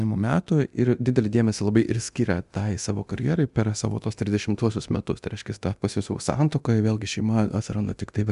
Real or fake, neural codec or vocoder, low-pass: fake; codec, 24 kHz, 0.9 kbps, DualCodec; 10.8 kHz